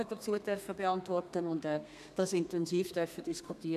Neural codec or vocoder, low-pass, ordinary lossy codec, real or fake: codec, 32 kHz, 1.9 kbps, SNAC; 14.4 kHz; none; fake